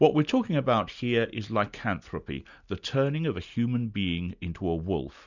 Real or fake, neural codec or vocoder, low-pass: real; none; 7.2 kHz